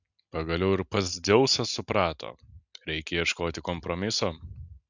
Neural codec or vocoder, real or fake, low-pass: none; real; 7.2 kHz